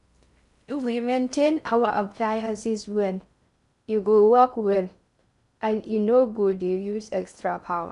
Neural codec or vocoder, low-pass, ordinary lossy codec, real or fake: codec, 16 kHz in and 24 kHz out, 0.6 kbps, FocalCodec, streaming, 4096 codes; 10.8 kHz; none; fake